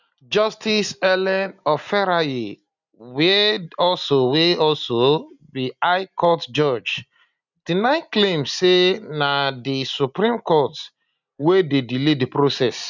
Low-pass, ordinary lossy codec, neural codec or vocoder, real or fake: 7.2 kHz; none; none; real